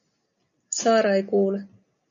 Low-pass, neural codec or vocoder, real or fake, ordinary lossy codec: 7.2 kHz; none; real; MP3, 96 kbps